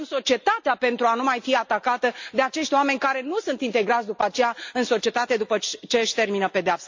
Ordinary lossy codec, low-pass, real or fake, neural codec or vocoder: MP3, 48 kbps; 7.2 kHz; real; none